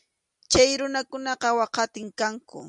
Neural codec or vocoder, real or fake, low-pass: none; real; 10.8 kHz